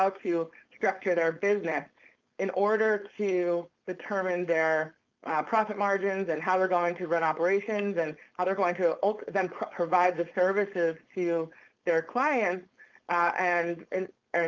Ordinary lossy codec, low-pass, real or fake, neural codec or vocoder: Opus, 24 kbps; 7.2 kHz; fake; codec, 16 kHz, 4.8 kbps, FACodec